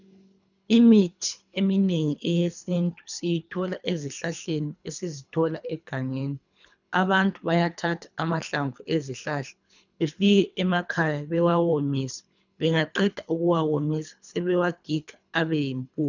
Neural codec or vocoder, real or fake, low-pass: codec, 24 kHz, 3 kbps, HILCodec; fake; 7.2 kHz